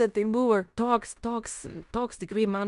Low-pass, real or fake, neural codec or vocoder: 10.8 kHz; fake; codec, 16 kHz in and 24 kHz out, 0.9 kbps, LongCat-Audio-Codec, fine tuned four codebook decoder